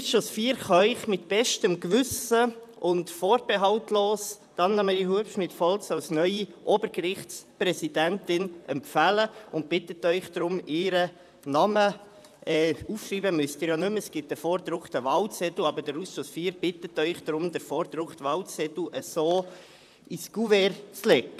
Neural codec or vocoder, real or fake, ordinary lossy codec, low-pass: vocoder, 44.1 kHz, 128 mel bands, Pupu-Vocoder; fake; none; 14.4 kHz